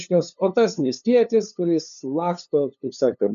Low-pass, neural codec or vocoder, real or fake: 7.2 kHz; codec, 16 kHz, 2 kbps, FunCodec, trained on LibriTTS, 25 frames a second; fake